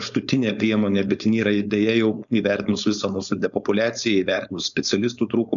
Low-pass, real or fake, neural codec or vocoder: 7.2 kHz; fake; codec, 16 kHz, 4.8 kbps, FACodec